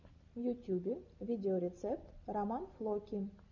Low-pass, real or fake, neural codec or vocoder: 7.2 kHz; real; none